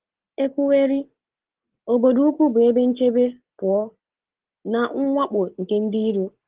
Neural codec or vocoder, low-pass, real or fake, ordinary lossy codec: none; 3.6 kHz; real; Opus, 16 kbps